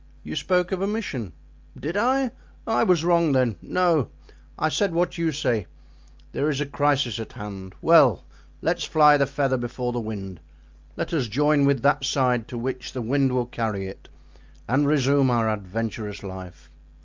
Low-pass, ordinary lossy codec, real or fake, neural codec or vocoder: 7.2 kHz; Opus, 24 kbps; real; none